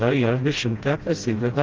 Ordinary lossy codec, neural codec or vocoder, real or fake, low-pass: Opus, 16 kbps; codec, 16 kHz, 0.5 kbps, FreqCodec, smaller model; fake; 7.2 kHz